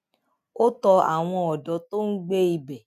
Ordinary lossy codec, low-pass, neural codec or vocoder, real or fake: none; 14.4 kHz; none; real